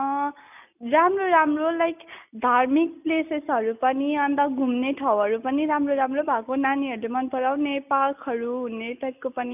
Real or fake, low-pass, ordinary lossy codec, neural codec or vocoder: real; 3.6 kHz; none; none